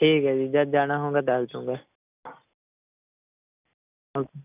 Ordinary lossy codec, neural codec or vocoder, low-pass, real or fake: none; none; 3.6 kHz; real